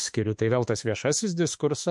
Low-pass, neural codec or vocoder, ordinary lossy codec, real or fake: 10.8 kHz; autoencoder, 48 kHz, 32 numbers a frame, DAC-VAE, trained on Japanese speech; MP3, 64 kbps; fake